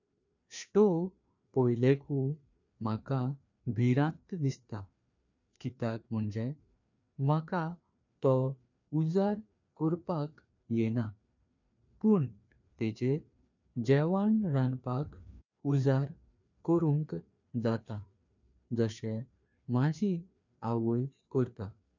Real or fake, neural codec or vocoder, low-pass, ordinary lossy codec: fake; codec, 16 kHz, 2 kbps, FreqCodec, larger model; 7.2 kHz; none